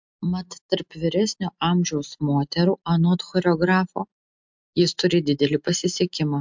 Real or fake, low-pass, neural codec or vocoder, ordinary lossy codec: real; 7.2 kHz; none; MP3, 64 kbps